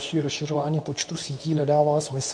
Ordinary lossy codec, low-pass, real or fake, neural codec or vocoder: MP3, 96 kbps; 9.9 kHz; fake; codec, 24 kHz, 0.9 kbps, WavTokenizer, medium speech release version 2